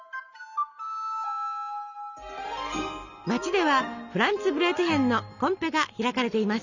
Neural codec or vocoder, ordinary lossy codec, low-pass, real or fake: none; none; 7.2 kHz; real